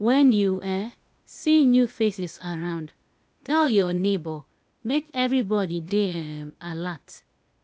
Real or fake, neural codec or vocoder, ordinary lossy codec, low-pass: fake; codec, 16 kHz, 0.8 kbps, ZipCodec; none; none